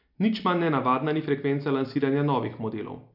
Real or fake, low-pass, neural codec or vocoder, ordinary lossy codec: real; 5.4 kHz; none; none